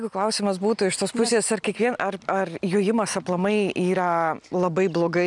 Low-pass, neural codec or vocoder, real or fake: 10.8 kHz; none; real